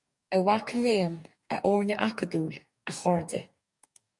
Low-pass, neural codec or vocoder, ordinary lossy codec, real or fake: 10.8 kHz; codec, 44.1 kHz, 2.6 kbps, DAC; MP3, 64 kbps; fake